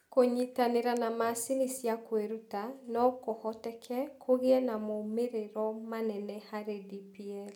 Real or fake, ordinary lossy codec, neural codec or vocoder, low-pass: real; none; none; 19.8 kHz